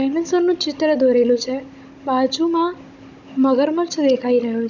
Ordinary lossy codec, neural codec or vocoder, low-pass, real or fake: none; codec, 16 kHz, 16 kbps, FunCodec, trained on Chinese and English, 50 frames a second; 7.2 kHz; fake